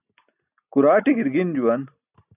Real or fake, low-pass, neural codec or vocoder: real; 3.6 kHz; none